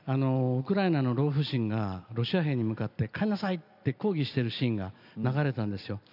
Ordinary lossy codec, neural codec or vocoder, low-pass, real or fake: none; none; 5.4 kHz; real